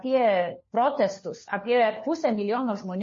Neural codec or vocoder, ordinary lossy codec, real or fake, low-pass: codec, 16 kHz, 4 kbps, FunCodec, trained on LibriTTS, 50 frames a second; MP3, 32 kbps; fake; 7.2 kHz